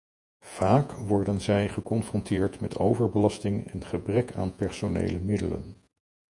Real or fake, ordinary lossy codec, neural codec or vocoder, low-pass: fake; MP3, 96 kbps; vocoder, 48 kHz, 128 mel bands, Vocos; 10.8 kHz